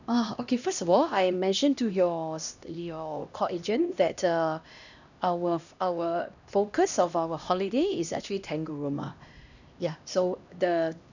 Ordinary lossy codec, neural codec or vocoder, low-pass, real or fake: none; codec, 16 kHz, 1 kbps, X-Codec, HuBERT features, trained on LibriSpeech; 7.2 kHz; fake